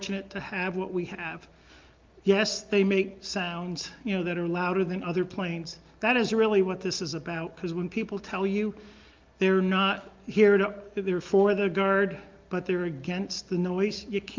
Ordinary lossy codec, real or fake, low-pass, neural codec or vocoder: Opus, 24 kbps; real; 7.2 kHz; none